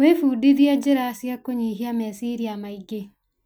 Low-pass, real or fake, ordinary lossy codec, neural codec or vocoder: none; real; none; none